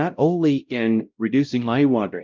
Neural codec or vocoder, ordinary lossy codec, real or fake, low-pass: codec, 16 kHz, 0.5 kbps, X-Codec, WavLM features, trained on Multilingual LibriSpeech; Opus, 24 kbps; fake; 7.2 kHz